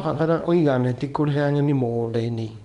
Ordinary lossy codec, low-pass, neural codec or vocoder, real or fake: none; 10.8 kHz; codec, 24 kHz, 0.9 kbps, WavTokenizer, small release; fake